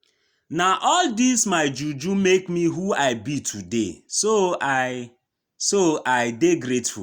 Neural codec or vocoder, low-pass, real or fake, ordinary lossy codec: none; 19.8 kHz; real; Opus, 64 kbps